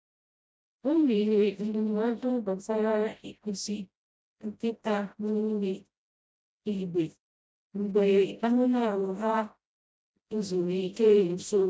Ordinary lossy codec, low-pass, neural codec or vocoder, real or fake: none; none; codec, 16 kHz, 0.5 kbps, FreqCodec, smaller model; fake